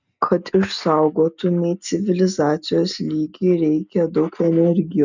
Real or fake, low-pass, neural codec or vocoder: real; 7.2 kHz; none